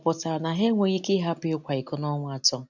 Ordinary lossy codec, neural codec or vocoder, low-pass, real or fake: none; none; 7.2 kHz; real